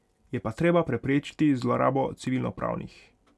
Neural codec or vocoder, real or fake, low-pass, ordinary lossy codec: none; real; none; none